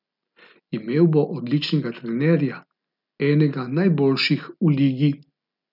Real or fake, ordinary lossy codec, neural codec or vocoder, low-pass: real; none; none; 5.4 kHz